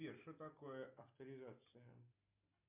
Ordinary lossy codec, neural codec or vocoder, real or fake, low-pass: MP3, 32 kbps; none; real; 3.6 kHz